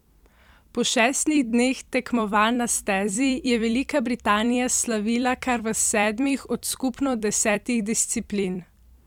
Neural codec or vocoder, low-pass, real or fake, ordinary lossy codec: vocoder, 44.1 kHz, 128 mel bands every 256 samples, BigVGAN v2; 19.8 kHz; fake; none